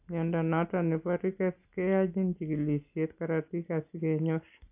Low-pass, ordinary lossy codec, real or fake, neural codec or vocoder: 3.6 kHz; AAC, 32 kbps; real; none